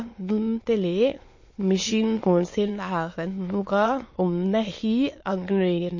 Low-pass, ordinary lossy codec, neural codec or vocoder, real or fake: 7.2 kHz; MP3, 32 kbps; autoencoder, 22.05 kHz, a latent of 192 numbers a frame, VITS, trained on many speakers; fake